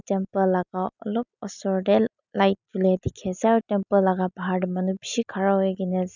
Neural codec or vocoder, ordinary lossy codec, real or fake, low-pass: none; none; real; 7.2 kHz